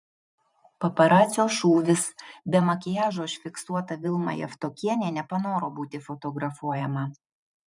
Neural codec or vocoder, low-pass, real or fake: vocoder, 44.1 kHz, 128 mel bands every 512 samples, BigVGAN v2; 10.8 kHz; fake